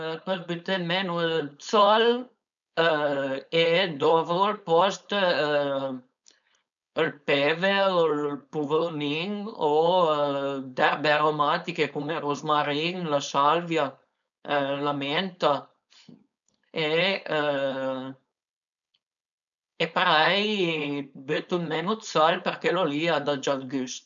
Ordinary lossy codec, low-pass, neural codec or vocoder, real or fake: none; 7.2 kHz; codec, 16 kHz, 4.8 kbps, FACodec; fake